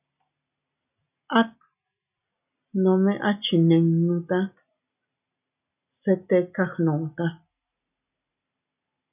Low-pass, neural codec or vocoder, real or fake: 3.6 kHz; none; real